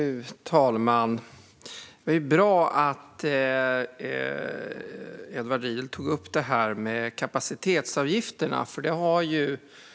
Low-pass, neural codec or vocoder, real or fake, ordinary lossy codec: none; none; real; none